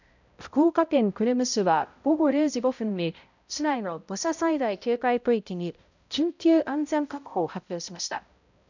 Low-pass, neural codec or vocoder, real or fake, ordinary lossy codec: 7.2 kHz; codec, 16 kHz, 0.5 kbps, X-Codec, HuBERT features, trained on balanced general audio; fake; none